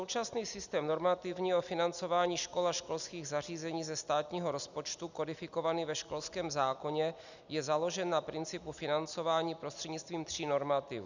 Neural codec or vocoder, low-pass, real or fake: none; 7.2 kHz; real